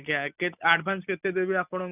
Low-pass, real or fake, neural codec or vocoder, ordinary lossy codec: 3.6 kHz; real; none; none